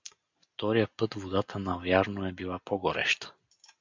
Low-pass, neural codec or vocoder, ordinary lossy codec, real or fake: 7.2 kHz; none; MP3, 64 kbps; real